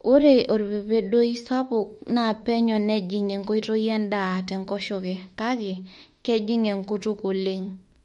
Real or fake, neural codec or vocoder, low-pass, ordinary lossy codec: fake; autoencoder, 48 kHz, 32 numbers a frame, DAC-VAE, trained on Japanese speech; 19.8 kHz; MP3, 48 kbps